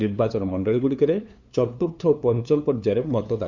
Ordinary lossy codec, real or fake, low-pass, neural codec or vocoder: Opus, 64 kbps; fake; 7.2 kHz; codec, 16 kHz, 2 kbps, FunCodec, trained on LibriTTS, 25 frames a second